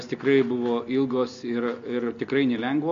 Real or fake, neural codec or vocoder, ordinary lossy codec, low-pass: real; none; MP3, 48 kbps; 7.2 kHz